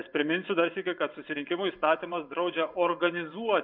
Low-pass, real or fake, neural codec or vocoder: 5.4 kHz; fake; vocoder, 24 kHz, 100 mel bands, Vocos